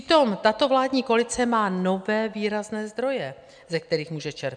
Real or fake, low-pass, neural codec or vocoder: real; 9.9 kHz; none